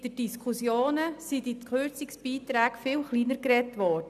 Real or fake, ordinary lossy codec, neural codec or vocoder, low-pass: real; none; none; 14.4 kHz